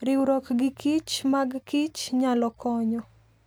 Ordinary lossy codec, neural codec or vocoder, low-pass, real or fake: none; none; none; real